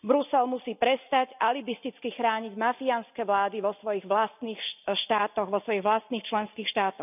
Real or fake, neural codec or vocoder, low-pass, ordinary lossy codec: real; none; 3.6 kHz; none